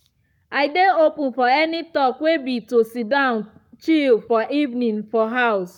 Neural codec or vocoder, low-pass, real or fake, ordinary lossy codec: codec, 44.1 kHz, 7.8 kbps, Pupu-Codec; 19.8 kHz; fake; none